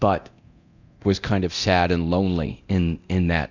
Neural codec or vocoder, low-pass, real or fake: codec, 24 kHz, 0.9 kbps, DualCodec; 7.2 kHz; fake